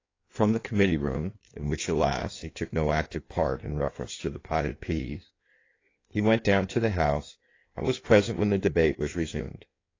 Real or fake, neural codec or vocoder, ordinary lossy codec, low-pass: fake; codec, 16 kHz in and 24 kHz out, 1.1 kbps, FireRedTTS-2 codec; AAC, 32 kbps; 7.2 kHz